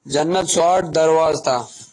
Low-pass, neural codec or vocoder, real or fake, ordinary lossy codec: 10.8 kHz; none; real; AAC, 32 kbps